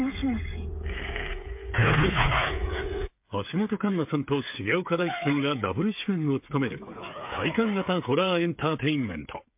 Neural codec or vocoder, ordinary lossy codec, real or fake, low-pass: codec, 16 kHz, 8 kbps, FreqCodec, smaller model; MP3, 32 kbps; fake; 3.6 kHz